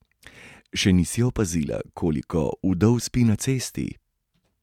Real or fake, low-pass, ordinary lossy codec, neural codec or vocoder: real; 19.8 kHz; MP3, 96 kbps; none